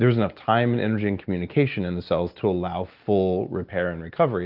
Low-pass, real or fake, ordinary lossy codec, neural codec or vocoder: 5.4 kHz; real; Opus, 24 kbps; none